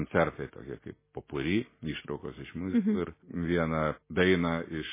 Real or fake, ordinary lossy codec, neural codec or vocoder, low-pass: real; MP3, 16 kbps; none; 3.6 kHz